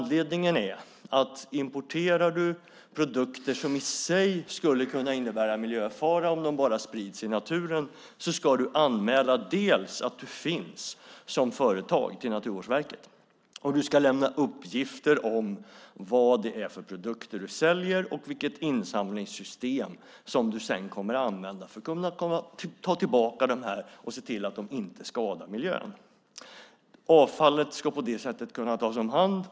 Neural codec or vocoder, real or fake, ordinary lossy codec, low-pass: none; real; none; none